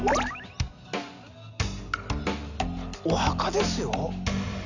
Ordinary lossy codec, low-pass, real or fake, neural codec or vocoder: none; 7.2 kHz; real; none